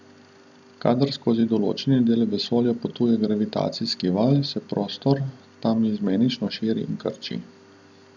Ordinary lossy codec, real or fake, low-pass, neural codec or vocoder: none; real; 7.2 kHz; none